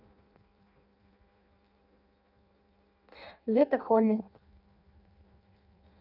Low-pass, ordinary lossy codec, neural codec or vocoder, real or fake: 5.4 kHz; none; codec, 16 kHz in and 24 kHz out, 0.6 kbps, FireRedTTS-2 codec; fake